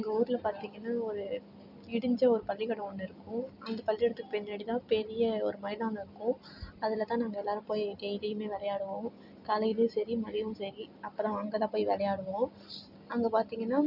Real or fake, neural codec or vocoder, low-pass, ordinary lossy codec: real; none; 5.4 kHz; none